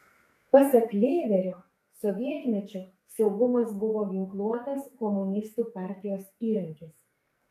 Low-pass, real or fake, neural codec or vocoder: 14.4 kHz; fake; codec, 44.1 kHz, 2.6 kbps, SNAC